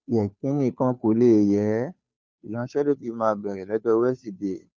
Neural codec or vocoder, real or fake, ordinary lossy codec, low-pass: codec, 16 kHz, 2 kbps, FunCodec, trained on Chinese and English, 25 frames a second; fake; none; none